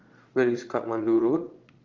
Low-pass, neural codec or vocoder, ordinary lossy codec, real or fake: 7.2 kHz; vocoder, 22.05 kHz, 80 mel bands, Vocos; Opus, 32 kbps; fake